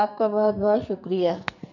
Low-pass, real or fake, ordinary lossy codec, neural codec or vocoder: 7.2 kHz; fake; none; codec, 44.1 kHz, 2.6 kbps, SNAC